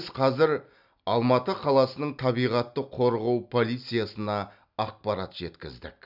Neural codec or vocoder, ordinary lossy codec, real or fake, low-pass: none; none; real; 5.4 kHz